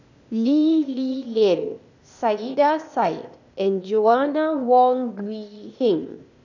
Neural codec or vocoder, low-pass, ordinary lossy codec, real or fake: codec, 16 kHz, 0.8 kbps, ZipCodec; 7.2 kHz; none; fake